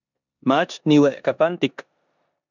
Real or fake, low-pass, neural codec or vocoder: fake; 7.2 kHz; codec, 16 kHz in and 24 kHz out, 0.9 kbps, LongCat-Audio-Codec, four codebook decoder